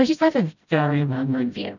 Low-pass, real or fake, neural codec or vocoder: 7.2 kHz; fake; codec, 16 kHz, 0.5 kbps, FreqCodec, smaller model